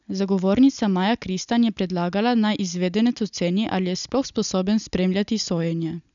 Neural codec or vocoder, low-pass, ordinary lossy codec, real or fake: none; 7.2 kHz; none; real